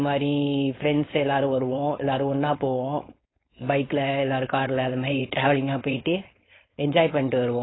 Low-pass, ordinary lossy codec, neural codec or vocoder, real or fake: 7.2 kHz; AAC, 16 kbps; codec, 16 kHz, 4.8 kbps, FACodec; fake